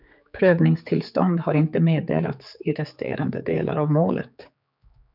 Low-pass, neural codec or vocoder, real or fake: 5.4 kHz; codec, 16 kHz, 4 kbps, X-Codec, HuBERT features, trained on general audio; fake